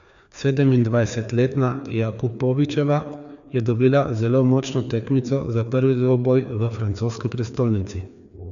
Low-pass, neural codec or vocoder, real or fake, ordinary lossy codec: 7.2 kHz; codec, 16 kHz, 2 kbps, FreqCodec, larger model; fake; none